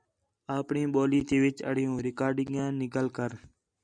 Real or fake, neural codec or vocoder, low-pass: real; none; 9.9 kHz